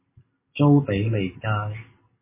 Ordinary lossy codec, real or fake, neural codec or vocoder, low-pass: MP3, 16 kbps; real; none; 3.6 kHz